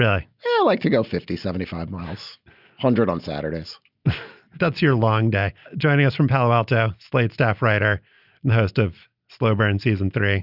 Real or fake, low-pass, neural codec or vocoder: real; 5.4 kHz; none